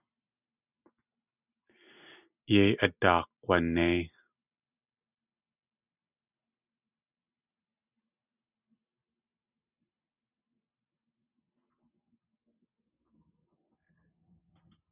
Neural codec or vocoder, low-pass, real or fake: none; 3.6 kHz; real